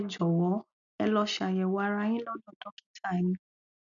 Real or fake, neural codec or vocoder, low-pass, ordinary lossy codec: real; none; 7.2 kHz; none